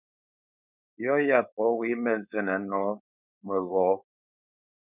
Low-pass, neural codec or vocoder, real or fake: 3.6 kHz; codec, 16 kHz, 4.8 kbps, FACodec; fake